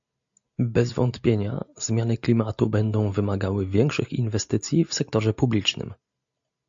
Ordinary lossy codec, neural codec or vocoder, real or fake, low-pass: AAC, 64 kbps; none; real; 7.2 kHz